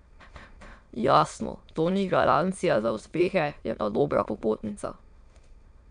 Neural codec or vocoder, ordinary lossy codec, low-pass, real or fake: autoencoder, 22.05 kHz, a latent of 192 numbers a frame, VITS, trained on many speakers; none; 9.9 kHz; fake